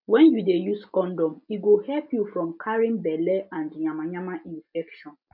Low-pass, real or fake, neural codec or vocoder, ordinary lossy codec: 5.4 kHz; real; none; MP3, 48 kbps